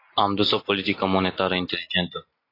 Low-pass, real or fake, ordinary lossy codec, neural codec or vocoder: 5.4 kHz; real; AAC, 24 kbps; none